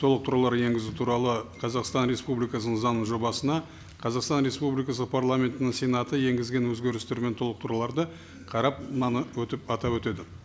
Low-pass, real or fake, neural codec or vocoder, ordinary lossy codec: none; real; none; none